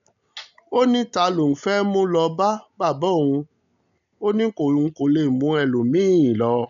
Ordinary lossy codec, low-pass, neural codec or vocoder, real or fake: none; 7.2 kHz; none; real